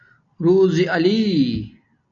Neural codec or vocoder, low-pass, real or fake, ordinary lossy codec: none; 7.2 kHz; real; MP3, 96 kbps